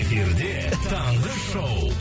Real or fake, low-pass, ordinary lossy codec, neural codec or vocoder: real; none; none; none